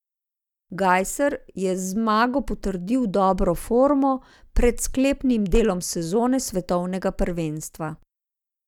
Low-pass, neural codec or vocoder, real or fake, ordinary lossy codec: 19.8 kHz; none; real; none